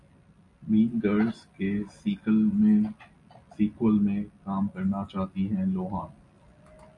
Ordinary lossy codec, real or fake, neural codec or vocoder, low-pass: MP3, 96 kbps; real; none; 10.8 kHz